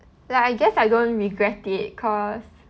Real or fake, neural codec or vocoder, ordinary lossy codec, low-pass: real; none; none; none